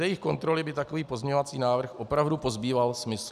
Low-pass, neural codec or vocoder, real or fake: 14.4 kHz; none; real